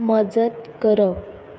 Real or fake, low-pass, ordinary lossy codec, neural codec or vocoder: fake; none; none; codec, 16 kHz, 8 kbps, FreqCodec, smaller model